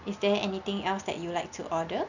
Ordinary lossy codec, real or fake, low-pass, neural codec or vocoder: none; real; 7.2 kHz; none